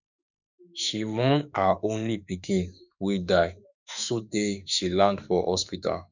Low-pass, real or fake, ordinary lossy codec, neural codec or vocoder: 7.2 kHz; fake; none; autoencoder, 48 kHz, 32 numbers a frame, DAC-VAE, trained on Japanese speech